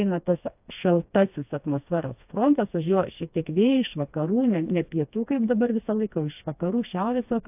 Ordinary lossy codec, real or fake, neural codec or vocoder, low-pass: AAC, 32 kbps; fake; codec, 16 kHz, 2 kbps, FreqCodec, smaller model; 3.6 kHz